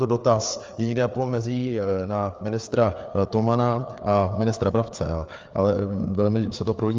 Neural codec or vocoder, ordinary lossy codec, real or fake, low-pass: codec, 16 kHz, 4 kbps, FreqCodec, larger model; Opus, 32 kbps; fake; 7.2 kHz